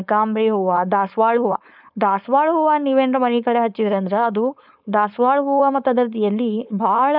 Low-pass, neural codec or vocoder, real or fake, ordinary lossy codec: 5.4 kHz; codec, 16 kHz, 4.8 kbps, FACodec; fake; none